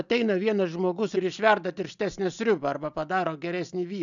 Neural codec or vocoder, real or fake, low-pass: none; real; 7.2 kHz